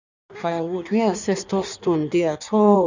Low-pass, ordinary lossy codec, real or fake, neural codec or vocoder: 7.2 kHz; none; fake; codec, 16 kHz in and 24 kHz out, 1.1 kbps, FireRedTTS-2 codec